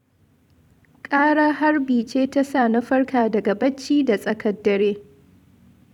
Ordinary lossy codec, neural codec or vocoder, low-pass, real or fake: none; vocoder, 44.1 kHz, 128 mel bands every 256 samples, BigVGAN v2; 19.8 kHz; fake